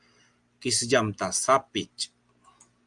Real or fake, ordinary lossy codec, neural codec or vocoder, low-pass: real; Opus, 32 kbps; none; 10.8 kHz